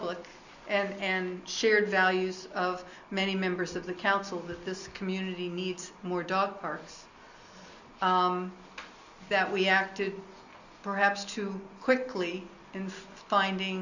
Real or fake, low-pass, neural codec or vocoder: real; 7.2 kHz; none